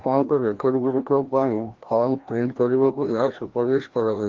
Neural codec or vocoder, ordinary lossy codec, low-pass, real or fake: codec, 16 kHz, 1 kbps, FreqCodec, larger model; Opus, 16 kbps; 7.2 kHz; fake